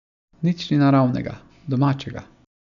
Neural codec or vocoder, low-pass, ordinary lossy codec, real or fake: none; 7.2 kHz; none; real